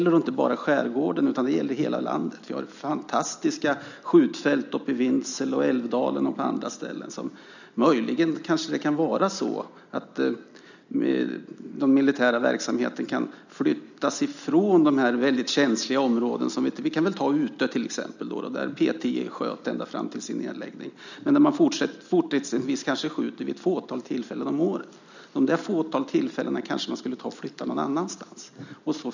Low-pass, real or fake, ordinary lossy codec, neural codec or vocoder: 7.2 kHz; real; none; none